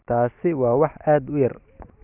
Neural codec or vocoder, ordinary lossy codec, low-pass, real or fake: none; none; 3.6 kHz; real